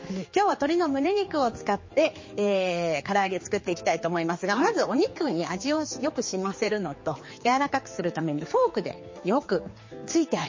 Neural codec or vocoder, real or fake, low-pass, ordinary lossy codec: codec, 16 kHz, 4 kbps, X-Codec, HuBERT features, trained on general audio; fake; 7.2 kHz; MP3, 32 kbps